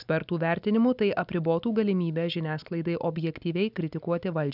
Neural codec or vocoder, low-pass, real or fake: none; 5.4 kHz; real